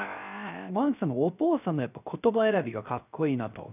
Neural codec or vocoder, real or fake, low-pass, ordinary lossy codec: codec, 16 kHz, 0.3 kbps, FocalCodec; fake; 3.6 kHz; none